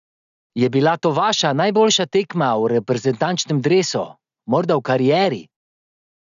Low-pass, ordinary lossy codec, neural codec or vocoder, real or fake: 7.2 kHz; none; none; real